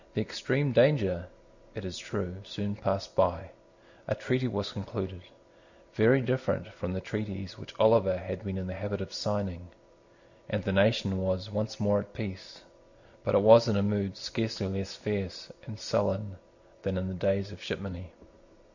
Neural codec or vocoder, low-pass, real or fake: none; 7.2 kHz; real